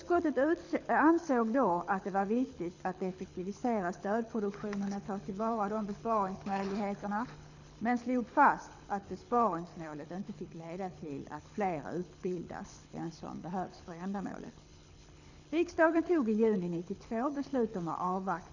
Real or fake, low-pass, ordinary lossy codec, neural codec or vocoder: fake; 7.2 kHz; none; codec, 24 kHz, 6 kbps, HILCodec